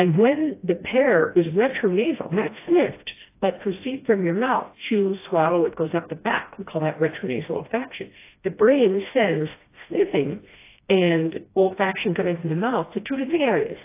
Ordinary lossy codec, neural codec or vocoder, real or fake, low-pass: AAC, 24 kbps; codec, 16 kHz, 1 kbps, FreqCodec, smaller model; fake; 3.6 kHz